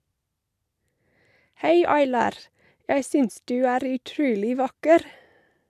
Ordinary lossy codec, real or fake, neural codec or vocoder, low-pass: MP3, 96 kbps; real; none; 14.4 kHz